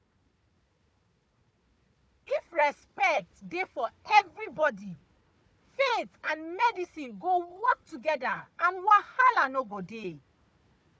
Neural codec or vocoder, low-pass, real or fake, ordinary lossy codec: codec, 16 kHz, 4 kbps, FunCodec, trained on Chinese and English, 50 frames a second; none; fake; none